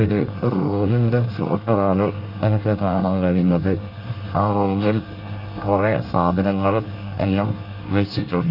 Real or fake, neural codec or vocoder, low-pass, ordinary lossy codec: fake; codec, 24 kHz, 1 kbps, SNAC; 5.4 kHz; none